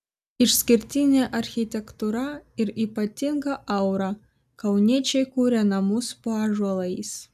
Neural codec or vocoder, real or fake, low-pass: none; real; 14.4 kHz